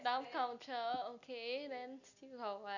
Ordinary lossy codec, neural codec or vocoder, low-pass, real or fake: none; none; 7.2 kHz; real